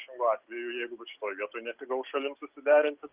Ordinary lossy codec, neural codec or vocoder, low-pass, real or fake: Opus, 32 kbps; none; 3.6 kHz; real